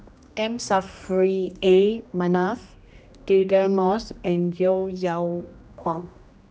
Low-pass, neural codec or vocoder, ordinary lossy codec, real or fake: none; codec, 16 kHz, 1 kbps, X-Codec, HuBERT features, trained on general audio; none; fake